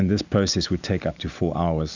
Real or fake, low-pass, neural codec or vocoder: real; 7.2 kHz; none